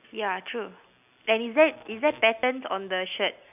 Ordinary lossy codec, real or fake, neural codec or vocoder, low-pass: none; real; none; 3.6 kHz